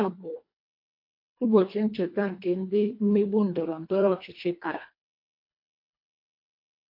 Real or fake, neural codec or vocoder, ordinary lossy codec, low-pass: fake; codec, 24 kHz, 1.5 kbps, HILCodec; MP3, 32 kbps; 5.4 kHz